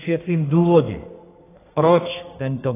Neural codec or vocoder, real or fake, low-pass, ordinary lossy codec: codec, 32 kHz, 1.9 kbps, SNAC; fake; 3.6 kHz; AAC, 16 kbps